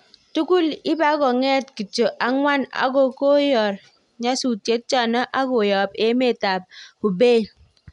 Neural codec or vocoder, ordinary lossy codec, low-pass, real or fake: none; none; 10.8 kHz; real